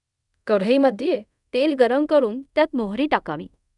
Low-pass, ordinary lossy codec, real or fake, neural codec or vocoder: 10.8 kHz; none; fake; codec, 24 kHz, 0.5 kbps, DualCodec